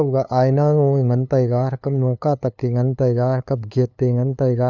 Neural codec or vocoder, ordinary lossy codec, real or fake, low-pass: codec, 16 kHz, 2 kbps, FunCodec, trained on LibriTTS, 25 frames a second; none; fake; 7.2 kHz